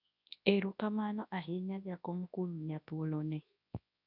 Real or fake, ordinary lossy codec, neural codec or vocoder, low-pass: fake; none; codec, 24 kHz, 0.9 kbps, WavTokenizer, large speech release; 5.4 kHz